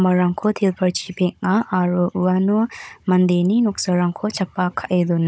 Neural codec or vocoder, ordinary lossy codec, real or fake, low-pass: codec, 16 kHz, 16 kbps, FunCodec, trained on Chinese and English, 50 frames a second; none; fake; none